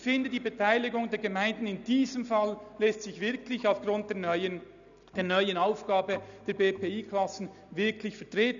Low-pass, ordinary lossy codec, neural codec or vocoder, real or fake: 7.2 kHz; none; none; real